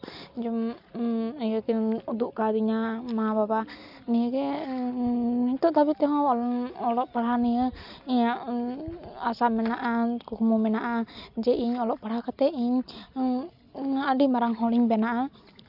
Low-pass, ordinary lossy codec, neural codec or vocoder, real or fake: 5.4 kHz; none; none; real